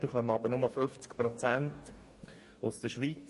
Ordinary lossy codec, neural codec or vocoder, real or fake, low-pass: MP3, 48 kbps; codec, 44.1 kHz, 2.6 kbps, DAC; fake; 14.4 kHz